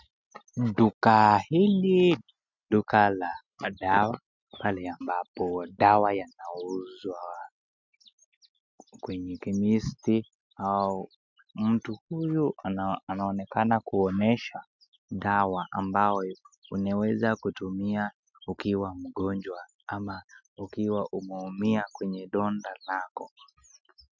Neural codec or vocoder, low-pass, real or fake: none; 7.2 kHz; real